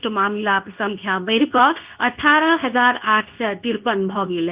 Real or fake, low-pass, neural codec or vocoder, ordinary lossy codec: fake; 3.6 kHz; codec, 24 kHz, 0.9 kbps, WavTokenizer, medium speech release version 2; Opus, 24 kbps